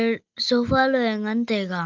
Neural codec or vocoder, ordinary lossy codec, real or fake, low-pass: none; Opus, 24 kbps; real; 7.2 kHz